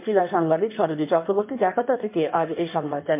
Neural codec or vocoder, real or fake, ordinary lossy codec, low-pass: codec, 16 kHz in and 24 kHz out, 1.1 kbps, FireRedTTS-2 codec; fake; MP3, 32 kbps; 3.6 kHz